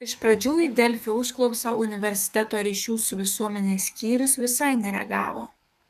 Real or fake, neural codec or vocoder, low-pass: fake; codec, 32 kHz, 1.9 kbps, SNAC; 14.4 kHz